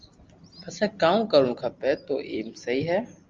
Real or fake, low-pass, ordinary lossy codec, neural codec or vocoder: real; 7.2 kHz; Opus, 32 kbps; none